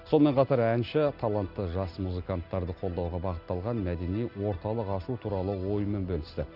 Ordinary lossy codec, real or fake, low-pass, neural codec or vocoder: none; real; 5.4 kHz; none